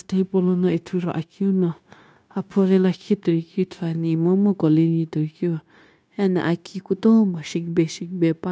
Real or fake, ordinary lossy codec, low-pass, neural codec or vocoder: fake; none; none; codec, 16 kHz, 0.9 kbps, LongCat-Audio-Codec